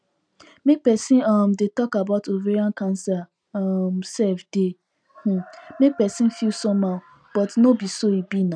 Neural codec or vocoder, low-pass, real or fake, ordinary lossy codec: none; 9.9 kHz; real; none